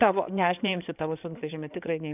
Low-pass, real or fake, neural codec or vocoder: 3.6 kHz; fake; codec, 16 kHz in and 24 kHz out, 2.2 kbps, FireRedTTS-2 codec